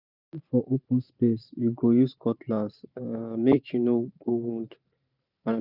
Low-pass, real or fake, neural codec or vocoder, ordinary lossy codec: 5.4 kHz; fake; vocoder, 44.1 kHz, 128 mel bands every 512 samples, BigVGAN v2; none